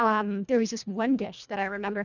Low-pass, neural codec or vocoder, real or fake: 7.2 kHz; codec, 24 kHz, 1.5 kbps, HILCodec; fake